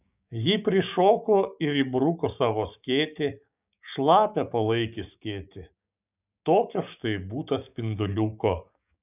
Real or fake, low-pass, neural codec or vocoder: fake; 3.6 kHz; codec, 16 kHz, 6 kbps, DAC